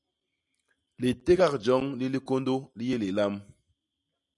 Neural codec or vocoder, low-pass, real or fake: none; 10.8 kHz; real